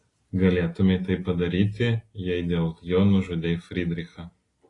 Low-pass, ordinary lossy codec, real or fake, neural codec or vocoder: 10.8 kHz; AAC, 32 kbps; real; none